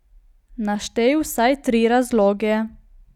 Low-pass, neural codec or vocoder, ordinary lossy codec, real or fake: 19.8 kHz; none; none; real